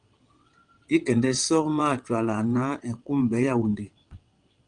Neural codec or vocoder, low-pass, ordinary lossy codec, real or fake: vocoder, 22.05 kHz, 80 mel bands, WaveNeXt; 9.9 kHz; Opus, 32 kbps; fake